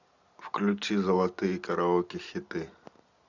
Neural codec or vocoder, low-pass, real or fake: none; 7.2 kHz; real